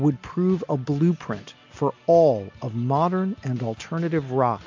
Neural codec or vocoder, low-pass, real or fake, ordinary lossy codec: none; 7.2 kHz; real; MP3, 64 kbps